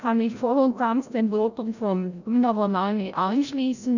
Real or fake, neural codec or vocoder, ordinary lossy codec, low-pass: fake; codec, 16 kHz, 0.5 kbps, FreqCodec, larger model; none; 7.2 kHz